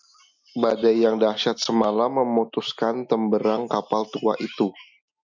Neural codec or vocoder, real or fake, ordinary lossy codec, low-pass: none; real; MP3, 64 kbps; 7.2 kHz